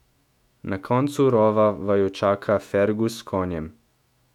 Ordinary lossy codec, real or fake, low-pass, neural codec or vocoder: none; fake; 19.8 kHz; autoencoder, 48 kHz, 128 numbers a frame, DAC-VAE, trained on Japanese speech